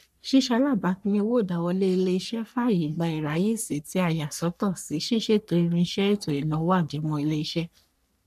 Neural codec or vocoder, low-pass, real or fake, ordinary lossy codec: codec, 44.1 kHz, 3.4 kbps, Pupu-Codec; 14.4 kHz; fake; none